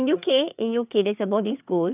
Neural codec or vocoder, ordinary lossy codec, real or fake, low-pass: codec, 16 kHz, 4 kbps, FreqCodec, larger model; none; fake; 3.6 kHz